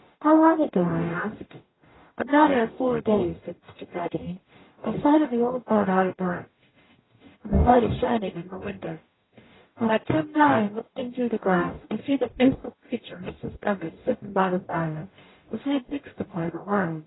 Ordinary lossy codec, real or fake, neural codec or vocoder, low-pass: AAC, 16 kbps; fake; codec, 44.1 kHz, 0.9 kbps, DAC; 7.2 kHz